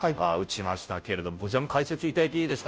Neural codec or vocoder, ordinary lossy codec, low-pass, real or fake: codec, 16 kHz, 0.5 kbps, FunCodec, trained on Chinese and English, 25 frames a second; none; none; fake